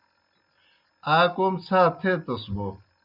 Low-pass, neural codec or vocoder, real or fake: 5.4 kHz; none; real